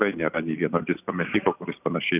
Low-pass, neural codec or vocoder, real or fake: 3.6 kHz; none; real